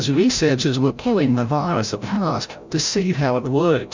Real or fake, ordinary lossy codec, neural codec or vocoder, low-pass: fake; MP3, 64 kbps; codec, 16 kHz, 0.5 kbps, FreqCodec, larger model; 7.2 kHz